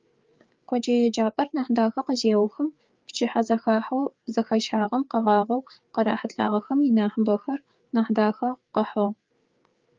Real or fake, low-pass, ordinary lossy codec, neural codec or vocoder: fake; 7.2 kHz; Opus, 32 kbps; codec, 16 kHz, 4 kbps, FreqCodec, larger model